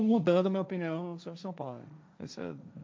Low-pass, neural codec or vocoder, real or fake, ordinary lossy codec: none; codec, 16 kHz, 1.1 kbps, Voila-Tokenizer; fake; none